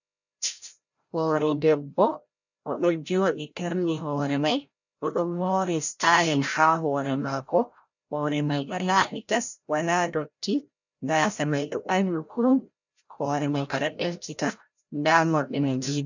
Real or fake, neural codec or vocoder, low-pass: fake; codec, 16 kHz, 0.5 kbps, FreqCodec, larger model; 7.2 kHz